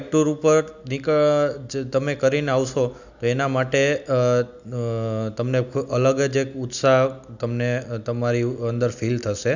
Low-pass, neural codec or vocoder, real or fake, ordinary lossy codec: 7.2 kHz; none; real; none